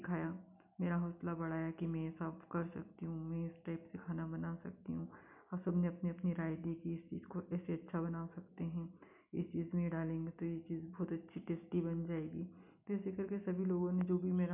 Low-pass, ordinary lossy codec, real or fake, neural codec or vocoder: 3.6 kHz; none; real; none